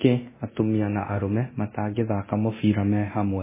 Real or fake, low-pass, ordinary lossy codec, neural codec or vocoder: fake; 3.6 kHz; MP3, 16 kbps; codec, 24 kHz, 0.9 kbps, DualCodec